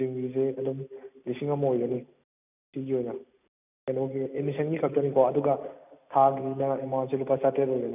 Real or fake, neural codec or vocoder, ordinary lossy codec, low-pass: real; none; none; 3.6 kHz